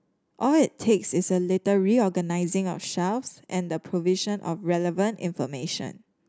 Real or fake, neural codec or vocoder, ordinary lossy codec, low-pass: real; none; none; none